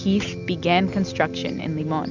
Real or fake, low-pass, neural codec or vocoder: real; 7.2 kHz; none